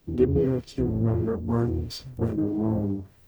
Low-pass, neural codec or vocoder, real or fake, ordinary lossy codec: none; codec, 44.1 kHz, 0.9 kbps, DAC; fake; none